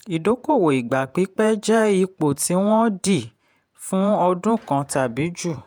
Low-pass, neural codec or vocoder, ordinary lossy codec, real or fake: none; vocoder, 48 kHz, 128 mel bands, Vocos; none; fake